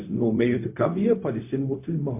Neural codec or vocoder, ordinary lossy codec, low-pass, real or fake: codec, 16 kHz, 0.4 kbps, LongCat-Audio-Codec; MP3, 24 kbps; 3.6 kHz; fake